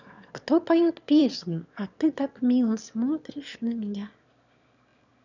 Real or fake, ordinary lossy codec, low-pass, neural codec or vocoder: fake; none; 7.2 kHz; autoencoder, 22.05 kHz, a latent of 192 numbers a frame, VITS, trained on one speaker